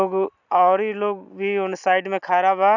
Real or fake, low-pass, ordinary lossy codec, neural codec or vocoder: real; 7.2 kHz; none; none